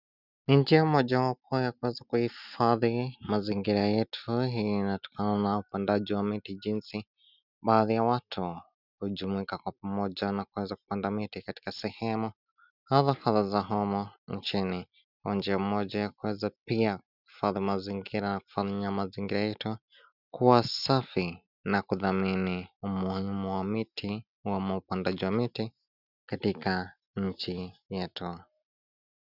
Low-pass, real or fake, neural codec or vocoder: 5.4 kHz; real; none